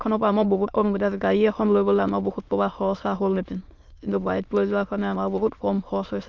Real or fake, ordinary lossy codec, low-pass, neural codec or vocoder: fake; Opus, 32 kbps; 7.2 kHz; autoencoder, 22.05 kHz, a latent of 192 numbers a frame, VITS, trained on many speakers